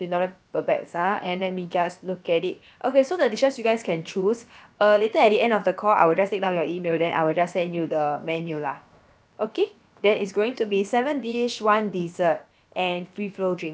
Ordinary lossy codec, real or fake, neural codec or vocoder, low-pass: none; fake; codec, 16 kHz, about 1 kbps, DyCAST, with the encoder's durations; none